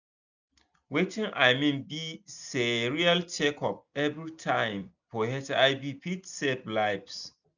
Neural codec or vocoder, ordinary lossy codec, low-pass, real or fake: none; none; 7.2 kHz; real